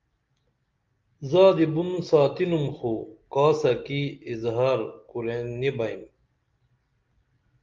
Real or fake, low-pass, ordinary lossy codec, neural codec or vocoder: real; 7.2 kHz; Opus, 24 kbps; none